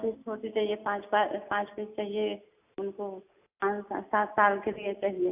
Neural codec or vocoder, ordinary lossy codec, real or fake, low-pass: none; AAC, 32 kbps; real; 3.6 kHz